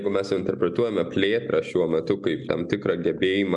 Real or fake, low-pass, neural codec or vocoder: real; 10.8 kHz; none